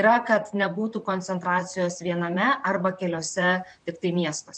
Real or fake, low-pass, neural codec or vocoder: fake; 9.9 kHz; vocoder, 44.1 kHz, 128 mel bands, Pupu-Vocoder